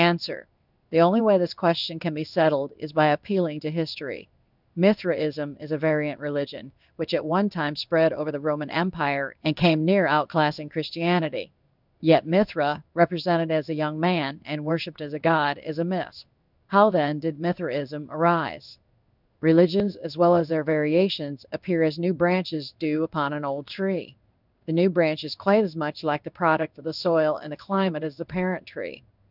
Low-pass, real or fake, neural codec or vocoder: 5.4 kHz; fake; codec, 16 kHz in and 24 kHz out, 1 kbps, XY-Tokenizer